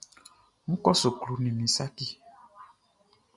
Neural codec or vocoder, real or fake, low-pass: none; real; 10.8 kHz